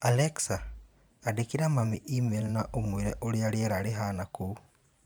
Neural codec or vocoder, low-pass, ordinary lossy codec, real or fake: vocoder, 44.1 kHz, 128 mel bands every 512 samples, BigVGAN v2; none; none; fake